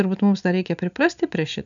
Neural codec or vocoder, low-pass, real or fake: none; 7.2 kHz; real